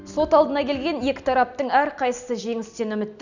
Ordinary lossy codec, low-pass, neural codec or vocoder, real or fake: none; 7.2 kHz; none; real